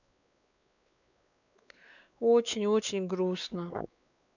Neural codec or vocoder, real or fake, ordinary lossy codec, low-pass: codec, 16 kHz, 4 kbps, X-Codec, WavLM features, trained on Multilingual LibriSpeech; fake; none; 7.2 kHz